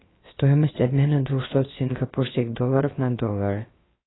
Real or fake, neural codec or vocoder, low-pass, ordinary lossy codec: fake; codec, 16 kHz, about 1 kbps, DyCAST, with the encoder's durations; 7.2 kHz; AAC, 16 kbps